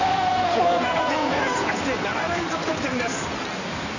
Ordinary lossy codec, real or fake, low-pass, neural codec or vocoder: none; fake; 7.2 kHz; codec, 16 kHz in and 24 kHz out, 2.2 kbps, FireRedTTS-2 codec